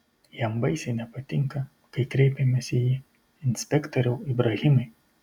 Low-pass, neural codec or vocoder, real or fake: 19.8 kHz; none; real